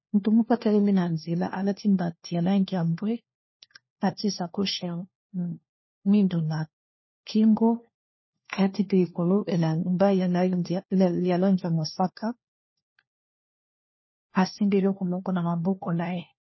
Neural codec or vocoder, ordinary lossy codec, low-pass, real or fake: codec, 16 kHz, 1 kbps, FunCodec, trained on LibriTTS, 50 frames a second; MP3, 24 kbps; 7.2 kHz; fake